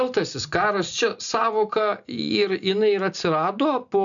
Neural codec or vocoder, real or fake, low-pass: none; real; 7.2 kHz